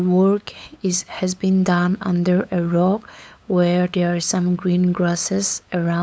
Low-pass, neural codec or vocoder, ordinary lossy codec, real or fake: none; codec, 16 kHz, 8 kbps, FunCodec, trained on LibriTTS, 25 frames a second; none; fake